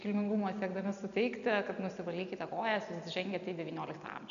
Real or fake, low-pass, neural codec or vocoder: real; 7.2 kHz; none